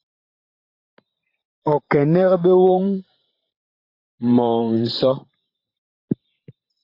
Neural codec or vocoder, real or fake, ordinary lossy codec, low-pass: vocoder, 44.1 kHz, 128 mel bands every 512 samples, BigVGAN v2; fake; AAC, 32 kbps; 5.4 kHz